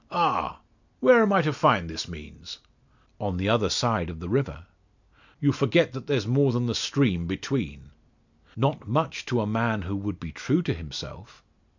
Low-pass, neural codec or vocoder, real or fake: 7.2 kHz; none; real